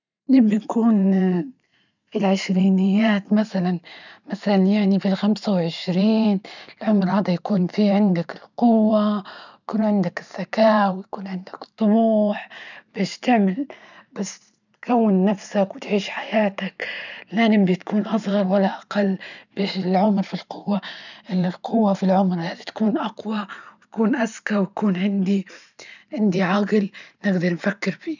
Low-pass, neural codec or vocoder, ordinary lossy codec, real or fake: 7.2 kHz; vocoder, 44.1 kHz, 128 mel bands every 512 samples, BigVGAN v2; none; fake